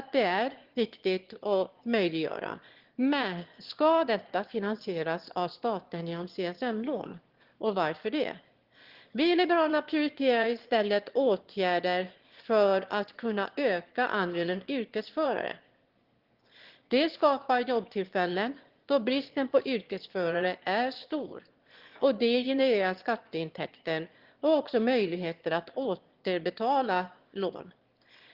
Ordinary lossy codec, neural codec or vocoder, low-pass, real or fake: Opus, 16 kbps; autoencoder, 22.05 kHz, a latent of 192 numbers a frame, VITS, trained on one speaker; 5.4 kHz; fake